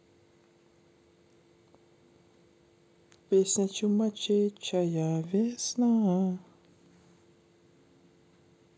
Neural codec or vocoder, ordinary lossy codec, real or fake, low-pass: none; none; real; none